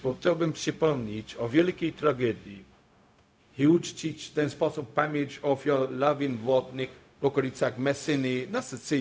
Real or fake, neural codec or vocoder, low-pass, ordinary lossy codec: fake; codec, 16 kHz, 0.4 kbps, LongCat-Audio-Codec; none; none